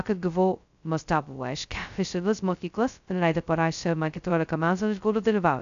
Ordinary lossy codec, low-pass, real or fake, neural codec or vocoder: AAC, 96 kbps; 7.2 kHz; fake; codec, 16 kHz, 0.2 kbps, FocalCodec